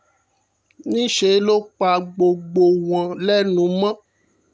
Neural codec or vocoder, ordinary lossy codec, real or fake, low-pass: none; none; real; none